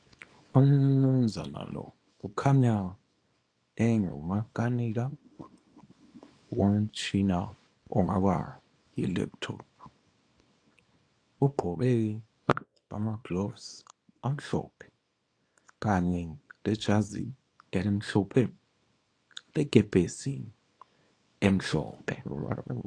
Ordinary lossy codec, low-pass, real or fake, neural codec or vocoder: AAC, 48 kbps; 9.9 kHz; fake; codec, 24 kHz, 0.9 kbps, WavTokenizer, small release